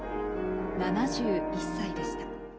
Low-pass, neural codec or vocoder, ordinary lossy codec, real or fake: none; none; none; real